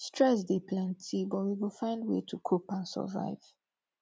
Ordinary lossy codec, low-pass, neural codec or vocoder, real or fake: none; none; none; real